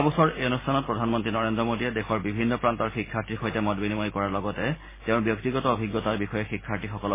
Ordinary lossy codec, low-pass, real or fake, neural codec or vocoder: MP3, 16 kbps; 3.6 kHz; real; none